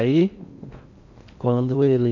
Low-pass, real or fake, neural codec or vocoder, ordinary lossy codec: 7.2 kHz; fake; codec, 16 kHz in and 24 kHz out, 0.6 kbps, FocalCodec, streaming, 2048 codes; none